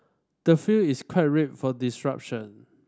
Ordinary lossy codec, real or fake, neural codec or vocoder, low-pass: none; real; none; none